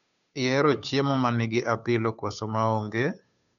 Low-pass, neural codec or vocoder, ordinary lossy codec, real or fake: 7.2 kHz; codec, 16 kHz, 2 kbps, FunCodec, trained on Chinese and English, 25 frames a second; none; fake